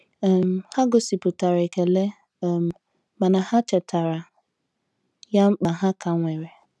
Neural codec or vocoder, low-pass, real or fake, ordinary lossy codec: none; none; real; none